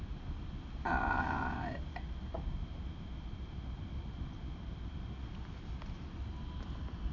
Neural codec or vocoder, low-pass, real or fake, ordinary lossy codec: none; 7.2 kHz; real; none